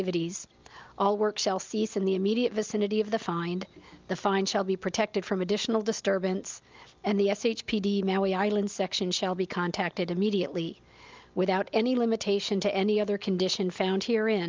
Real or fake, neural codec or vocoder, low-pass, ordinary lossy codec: real; none; 7.2 kHz; Opus, 24 kbps